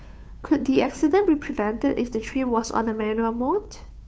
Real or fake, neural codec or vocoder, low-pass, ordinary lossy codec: fake; codec, 16 kHz, 2 kbps, FunCodec, trained on Chinese and English, 25 frames a second; none; none